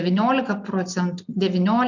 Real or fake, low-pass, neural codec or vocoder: real; 7.2 kHz; none